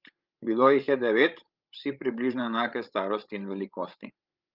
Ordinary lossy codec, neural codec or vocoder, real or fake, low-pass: Opus, 24 kbps; codec, 16 kHz, 16 kbps, FreqCodec, larger model; fake; 5.4 kHz